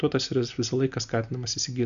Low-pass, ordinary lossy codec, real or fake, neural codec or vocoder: 7.2 kHz; AAC, 96 kbps; real; none